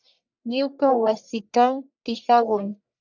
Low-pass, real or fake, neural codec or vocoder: 7.2 kHz; fake; codec, 44.1 kHz, 1.7 kbps, Pupu-Codec